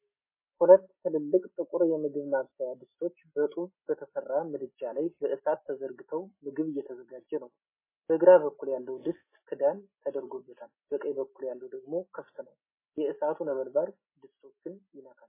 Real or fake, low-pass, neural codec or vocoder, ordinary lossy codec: real; 3.6 kHz; none; MP3, 16 kbps